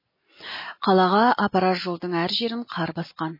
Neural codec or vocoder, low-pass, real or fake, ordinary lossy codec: none; 5.4 kHz; real; MP3, 24 kbps